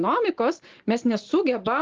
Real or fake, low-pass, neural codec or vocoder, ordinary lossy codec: real; 7.2 kHz; none; Opus, 16 kbps